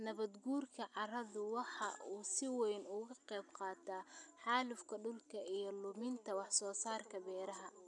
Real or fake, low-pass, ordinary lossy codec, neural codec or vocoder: real; 10.8 kHz; none; none